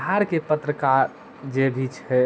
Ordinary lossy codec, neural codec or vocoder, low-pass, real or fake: none; none; none; real